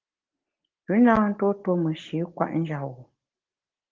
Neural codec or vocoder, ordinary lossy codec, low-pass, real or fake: none; Opus, 16 kbps; 7.2 kHz; real